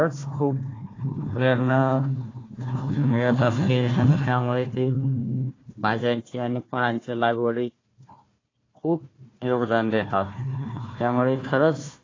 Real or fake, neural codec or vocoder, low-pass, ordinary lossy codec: fake; codec, 16 kHz, 1 kbps, FunCodec, trained on Chinese and English, 50 frames a second; 7.2 kHz; AAC, 32 kbps